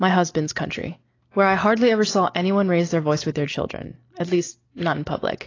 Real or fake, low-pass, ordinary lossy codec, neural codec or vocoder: real; 7.2 kHz; AAC, 32 kbps; none